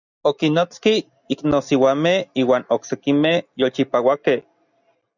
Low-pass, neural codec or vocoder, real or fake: 7.2 kHz; none; real